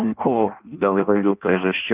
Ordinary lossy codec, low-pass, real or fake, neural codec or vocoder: Opus, 24 kbps; 3.6 kHz; fake; codec, 16 kHz in and 24 kHz out, 0.6 kbps, FireRedTTS-2 codec